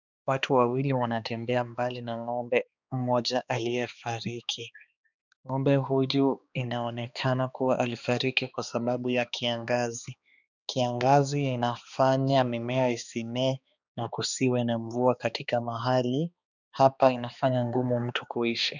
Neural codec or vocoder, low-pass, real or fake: codec, 16 kHz, 2 kbps, X-Codec, HuBERT features, trained on balanced general audio; 7.2 kHz; fake